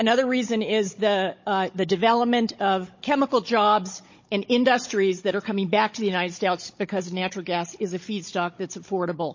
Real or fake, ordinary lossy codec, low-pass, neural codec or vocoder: fake; MP3, 32 kbps; 7.2 kHz; codec, 16 kHz, 16 kbps, FunCodec, trained on Chinese and English, 50 frames a second